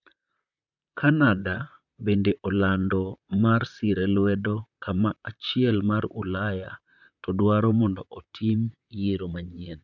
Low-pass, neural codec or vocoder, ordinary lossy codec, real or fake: 7.2 kHz; vocoder, 44.1 kHz, 128 mel bands, Pupu-Vocoder; none; fake